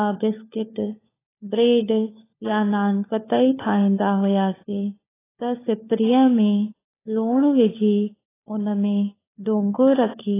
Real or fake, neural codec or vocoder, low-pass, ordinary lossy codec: fake; codec, 16 kHz, 4 kbps, FunCodec, trained on LibriTTS, 50 frames a second; 3.6 kHz; AAC, 16 kbps